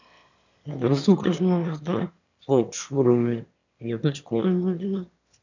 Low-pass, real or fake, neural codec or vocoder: 7.2 kHz; fake; autoencoder, 22.05 kHz, a latent of 192 numbers a frame, VITS, trained on one speaker